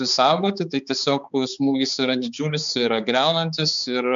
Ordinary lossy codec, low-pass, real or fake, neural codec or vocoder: MP3, 64 kbps; 7.2 kHz; fake; codec, 16 kHz, 4 kbps, X-Codec, HuBERT features, trained on balanced general audio